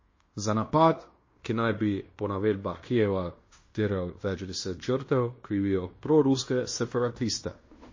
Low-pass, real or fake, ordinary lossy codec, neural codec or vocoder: 7.2 kHz; fake; MP3, 32 kbps; codec, 16 kHz in and 24 kHz out, 0.9 kbps, LongCat-Audio-Codec, fine tuned four codebook decoder